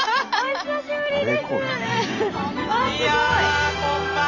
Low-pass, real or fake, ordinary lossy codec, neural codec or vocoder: 7.2 kHz; fake; none; vocoder, 44.1 kHz, 128 mel bands every 512 samples, BigVGAN v2